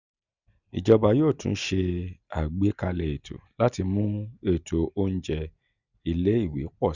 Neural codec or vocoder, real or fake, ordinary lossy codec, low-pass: none; real; none; 7.2 kHz